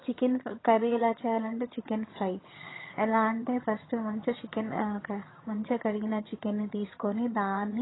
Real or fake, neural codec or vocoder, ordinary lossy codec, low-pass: fake; vocoder, 22.05 kHz, 80 mel bands, HiFi-GAN; AAC, 16 kbps; 7.2 kHz